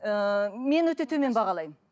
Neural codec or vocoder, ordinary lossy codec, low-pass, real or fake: none; none; none; real